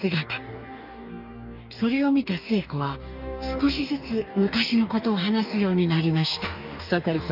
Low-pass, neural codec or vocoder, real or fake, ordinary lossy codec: 5.4 kHz; codec, 44.1 kHz, 2.6 kbps, DAC; fake; none